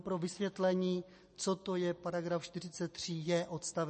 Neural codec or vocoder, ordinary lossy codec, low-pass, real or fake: none; MP3, 32 kbps; 10.8 kHz; real